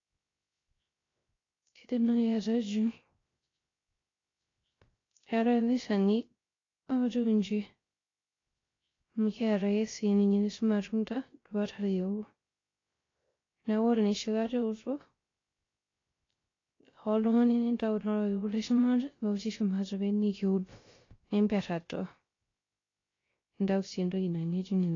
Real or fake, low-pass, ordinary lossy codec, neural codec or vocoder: fake; 7.2 kHz; AAC, 32 kbps; codec, 16 kHz, 0.3 kbps, FocalCodec